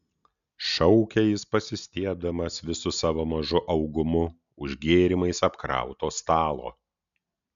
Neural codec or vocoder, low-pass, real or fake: none; 7.2 kHz; real